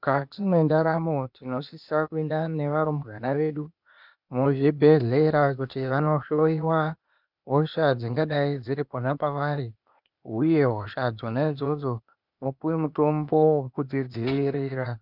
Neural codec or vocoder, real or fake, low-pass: codec, 16 kHz, 0.8 kbps, ZipCodec; fake; 5.4 kHz